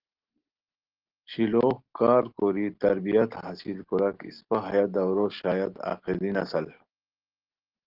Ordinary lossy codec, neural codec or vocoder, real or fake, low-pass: Opus, 16 kbps; none; real; 5.4 kHz